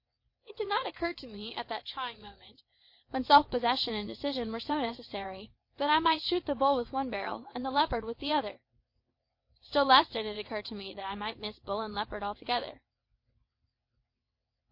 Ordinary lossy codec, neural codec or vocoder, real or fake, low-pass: MP3, 32 kbps; vocoder, 22.05 kHz, 80 mel bands, WaveNeXt; fake; 5.4 kHz